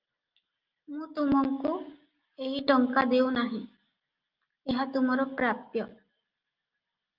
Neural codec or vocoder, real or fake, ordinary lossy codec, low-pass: none; real; Opus, 24 kbps; 5.4 kHz